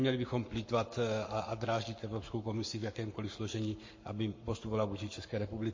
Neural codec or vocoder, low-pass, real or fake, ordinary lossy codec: codec, 44.1 kHz, 7.8 kbps, Pupu-Codec; 7.2 kHz; fake; MP3, 32 kbps